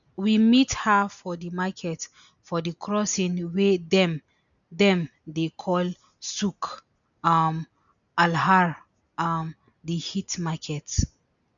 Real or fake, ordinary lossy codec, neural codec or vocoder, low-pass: real; none; none; 7.2 kHz